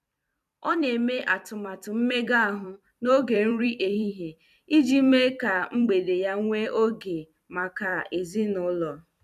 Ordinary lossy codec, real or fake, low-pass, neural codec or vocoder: none; fake; 14.4 kHz; vocoder, 44.1 kHz, 128 mel bands every 256 samples, BigVGAN v2